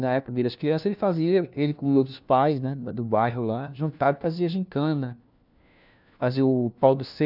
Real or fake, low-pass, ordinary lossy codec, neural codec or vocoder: fake; 5.4 kHz; none; codec, 16 kHz, 1 kbps, FunCodec, trained on LibriTTS, 50 frames a second